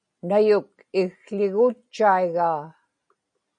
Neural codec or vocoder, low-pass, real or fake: none; 9.9 kHz; real